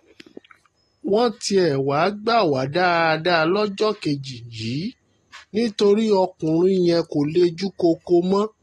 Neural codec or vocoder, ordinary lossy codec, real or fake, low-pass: none; AAC, 32 kbps; real; 19.8 kHz